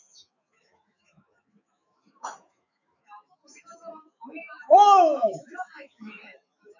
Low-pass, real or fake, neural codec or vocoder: 7.2 kHz; fake; autoencoder, 48 kHz, 128 numbers a frame, DAC-VAE, trained on Japanese speech